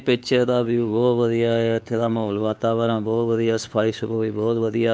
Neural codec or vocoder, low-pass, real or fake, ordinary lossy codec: codec, 16 kHz, 4 kbps, X-Codec, WavLM features, trained on Multilingual LibriSpeech; none; fake; none